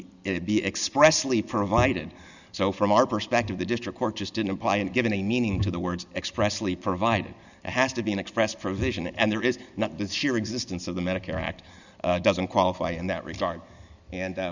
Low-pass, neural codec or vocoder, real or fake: 7.2 kHz; none; real